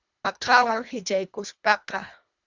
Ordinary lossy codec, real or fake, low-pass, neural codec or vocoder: Opus, 64 kbps; fake; 7.2 kHz; codec, 24 kHz, 1.5 kbps, HILCodec